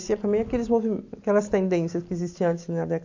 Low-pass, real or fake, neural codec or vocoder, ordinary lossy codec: 7.2 kHz; real; none; AAC, 48 kbps